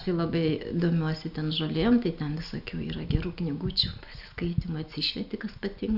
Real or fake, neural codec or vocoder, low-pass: real; none; 5.4 kHz